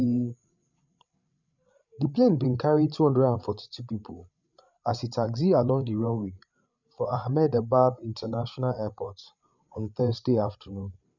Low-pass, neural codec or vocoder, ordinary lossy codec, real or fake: 7.2 kHz; codec, 16 kHz, 8 kbps, FreqCodec, larger model; none; fake